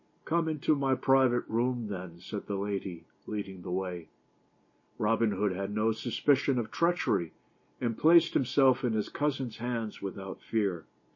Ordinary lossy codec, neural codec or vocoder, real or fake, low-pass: MP3, 32 kbps; none; real; 7.2 kHz